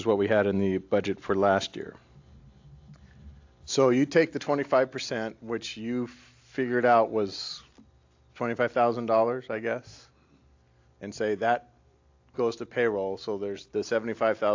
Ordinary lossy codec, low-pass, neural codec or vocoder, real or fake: AAC, 48 kbps; 7.2 kHz; none; real